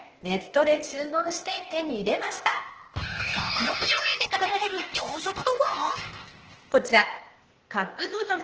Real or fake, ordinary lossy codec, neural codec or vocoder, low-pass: fake; Opus, 16 kbps; codec, 16 kHz, 0.8 kbps, ZipCodec; 7.2 kHz